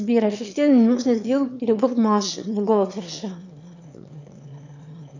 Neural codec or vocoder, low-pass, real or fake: autoencoder, 22.05 kHz, a latent of 192 numbers a frame, VITS, trained on one speaker; 7.2 kHz; fake